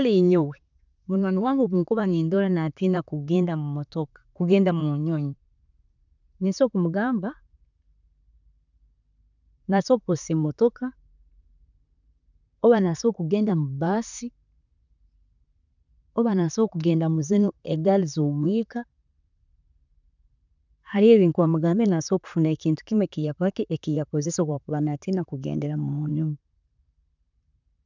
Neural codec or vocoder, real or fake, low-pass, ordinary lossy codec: vocoder, 44.1 kHz, 128 mel bands, Pupu-Vocoder; fake; 7.2 kHz; none